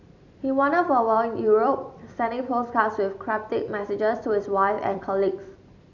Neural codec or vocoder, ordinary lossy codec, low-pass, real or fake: vocoder, 44.1 kHz, 128 mel bands every 256 samples, BigVGAN v2; none; 7.2 kHz; fake